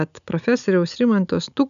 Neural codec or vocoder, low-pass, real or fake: none; 7.2 kHz; real